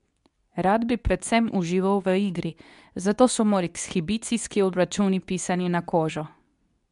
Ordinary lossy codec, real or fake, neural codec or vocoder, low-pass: none; fake; codec, 24 kHz, 0.9 kbps, WavTokenizer, medium speech release version 2; 10.8 kHz